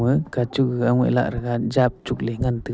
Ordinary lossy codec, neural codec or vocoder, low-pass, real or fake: none; none; none; real